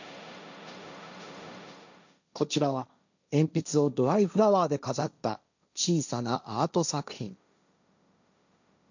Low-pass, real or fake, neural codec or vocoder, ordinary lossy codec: 7.2 kHz; fake; codec, 16 kHz, 1.1 kbps, Voila-Tokenizer; none